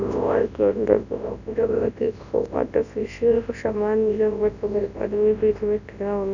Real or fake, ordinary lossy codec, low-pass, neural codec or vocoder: fake; none; 7.2 kHz; codec, 24 kHz, 0.9 kbps, WavTokenizer, large speech release